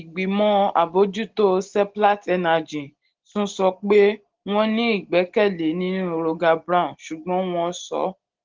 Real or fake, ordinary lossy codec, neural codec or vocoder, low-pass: real; Opus, 16 kbps; none; 7.2 kHz